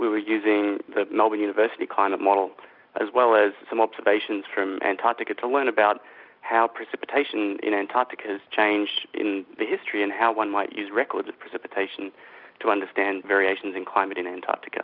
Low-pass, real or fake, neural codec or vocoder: 5.4 kHz; real; none